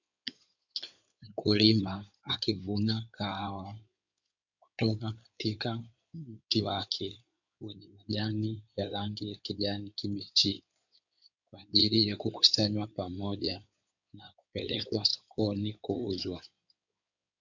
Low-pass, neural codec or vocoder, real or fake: 7.2 kHz; codec, 16 kHz in and 24 kHz out, 2.2 kbps, FireRedTTS-2 codec; fake